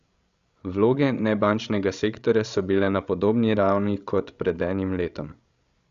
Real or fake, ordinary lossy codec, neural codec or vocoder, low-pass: fake; Opus, 64 kbps; codec, 16 kHz, 16 kbps, FreqCodec, larger model; 7.2 kHz